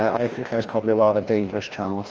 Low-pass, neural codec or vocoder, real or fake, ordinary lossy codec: 7.2 kHz; codec, 16 kHz, 1 kbps, FreqCodec, larger model; fake; Opus, 24 kbps